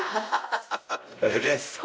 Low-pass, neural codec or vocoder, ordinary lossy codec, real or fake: none; codec, 16 kHz, 0.5 kbps, X-Codec, WavLM features, trained on Multilingual LibriSpeech; none; fake